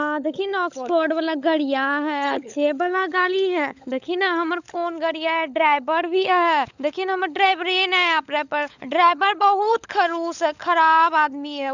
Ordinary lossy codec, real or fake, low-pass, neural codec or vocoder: none; fake; 7.2 kHz; codec, 16 kHz, 16 kbps, FunCodec, trained on LibriTTS, 50 frames a second